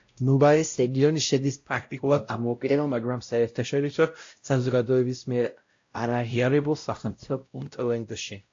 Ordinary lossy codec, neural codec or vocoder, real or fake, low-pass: AAC, 48 kbps; codec, 16 kHz, 0.5 kbps, X-Codec, WavLM features, trained on Multilingual LibriSpeech; fake; 7.2 kHz